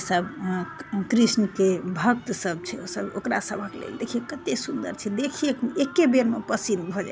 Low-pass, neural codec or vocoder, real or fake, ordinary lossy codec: none; none; real; none